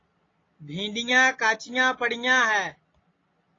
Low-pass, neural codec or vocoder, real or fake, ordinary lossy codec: 7.2 kHz; none; real; AAC, 32 kbps